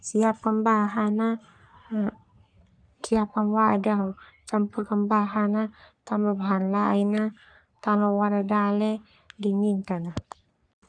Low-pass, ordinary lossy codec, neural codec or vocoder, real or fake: 9.9 kHz; none; codec, 44.1 kHz, 3.4 kbps, Pupu-Codec; fake